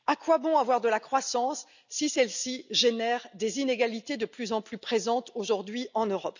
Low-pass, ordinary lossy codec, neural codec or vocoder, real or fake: 7.2 kHz; none; none; real